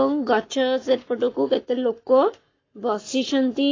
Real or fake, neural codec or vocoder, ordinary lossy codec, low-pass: fake; codec, 44.1 kHz, 7.8 kbps, Pupu-Codec; AAC, 32 kbps; 7.2 kHz